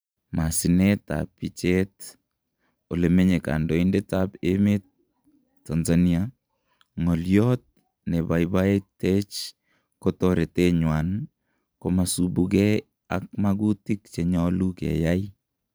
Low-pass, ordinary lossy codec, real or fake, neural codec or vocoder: none; none; fake; vocoder, 44.1 kHz, 128 mel bands every 512 samples, BigVGAN v2